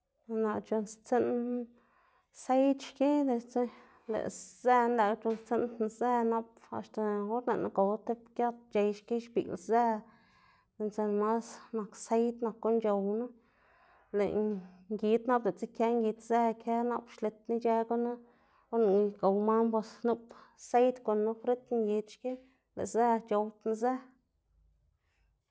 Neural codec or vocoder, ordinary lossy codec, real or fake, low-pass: none; none; real; none